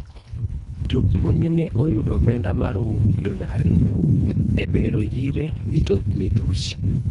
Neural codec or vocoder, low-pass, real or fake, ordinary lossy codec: codec, 24 kHz, 1.5 kbps, HILCodec; 10.8 kHz; fake; none